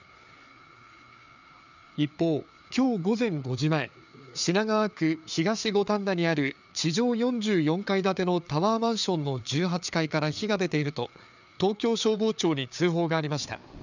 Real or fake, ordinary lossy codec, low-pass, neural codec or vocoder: fake; none; 7.2 kHz; codec, 16 kHz, 4 kbps, FreqCodec, larger model